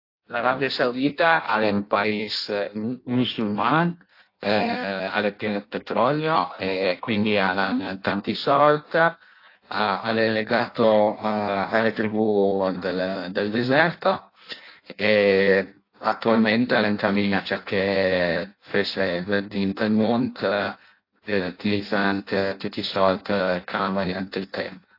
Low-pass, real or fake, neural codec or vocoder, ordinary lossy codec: 5.4 kHz; fake; codec, 16 kHz in and 24 kHz out, 0.6 kbps, FireRedTTS-2 codec; AAC, 32 kbps